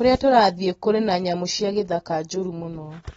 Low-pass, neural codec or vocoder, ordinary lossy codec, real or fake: 19.8 kHz; none; AAC, 24 kbps; real